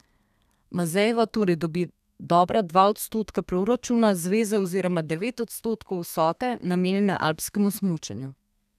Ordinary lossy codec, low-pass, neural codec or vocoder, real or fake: none; 14.4 kHz; codec, 32 kHz, 1.9 kbps, SNAC; fake